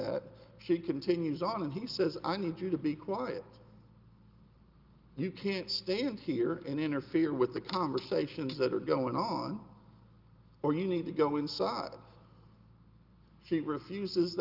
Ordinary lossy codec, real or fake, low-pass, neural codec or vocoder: Opus, 24 kbps; real; 5.4 kHz; none